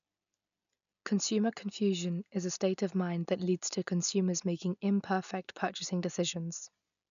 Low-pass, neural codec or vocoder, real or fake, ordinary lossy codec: 7.2 kHz; none; real; none